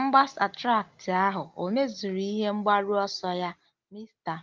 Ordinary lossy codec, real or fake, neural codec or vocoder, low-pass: Opus, 32 kbps; real; none; 7.2 kHz